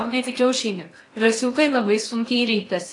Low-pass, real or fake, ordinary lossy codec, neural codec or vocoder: 10.8 kHz; fake; AAC, 48 kbps; codec, 16 kHz in and 24 kHz out, 0.8 kbps, FocalCodec, streaming, 65536 codes